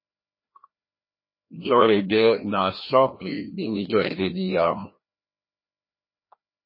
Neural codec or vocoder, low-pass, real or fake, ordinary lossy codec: codec, 16 kHz, 1 kbps, FreqCodec, larger model; 5.4 kHz; fake; MP3, 24 kbps